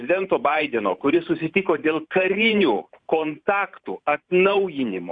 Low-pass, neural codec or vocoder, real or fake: 9.9 kHz; vocoder, 44.1 kHz, 128 mel bands every 512 samples, BigVGAN v2; fake